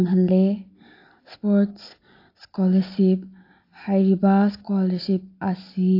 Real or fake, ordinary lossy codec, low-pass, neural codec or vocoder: real; AAC, 32 kbps; 5.4 kHz; none